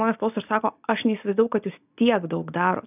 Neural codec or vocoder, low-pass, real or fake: none; 3.6 kHz; real